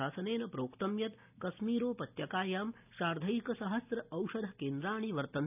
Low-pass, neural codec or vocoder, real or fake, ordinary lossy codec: 3.6 kHz; none; real; none